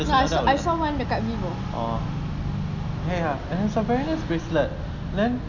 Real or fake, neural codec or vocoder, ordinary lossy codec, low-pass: real; none; none; 7.2 kHz